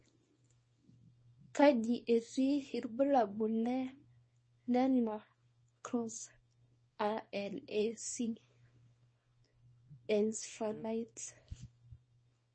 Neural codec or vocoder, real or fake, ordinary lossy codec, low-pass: codec, 24 kHz, 0.9 kbps, WavTokenizer, small release; fake; MP3, 32 kbps; 9.9 kHz